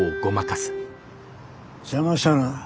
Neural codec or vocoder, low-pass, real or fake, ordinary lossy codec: none; none; real; none